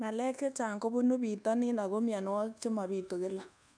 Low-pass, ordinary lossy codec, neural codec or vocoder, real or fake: 9.9 kHz; none; codec, 24 kHz, 1.2 kbps, DualCodec; fake